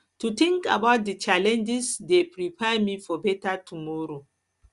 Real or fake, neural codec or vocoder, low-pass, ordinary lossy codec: real; none; 10.8 kHz; Opus, 64 kbps